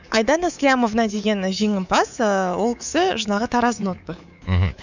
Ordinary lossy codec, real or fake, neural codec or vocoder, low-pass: none; fake; codec, 24 kHz, 3.1 kbps, DualCodec; 7.2 kHz